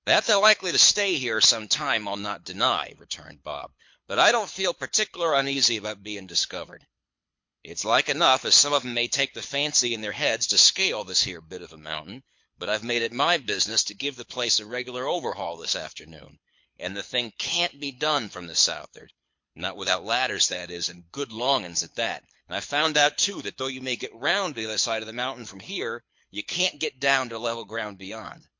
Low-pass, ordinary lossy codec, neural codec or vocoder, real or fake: 7.2 kHz; MP3, 48 kbps; codec, 24 kHz, 6 kbps, HILCodec; fake